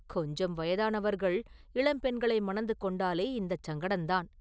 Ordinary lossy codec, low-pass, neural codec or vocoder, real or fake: none; none; none; real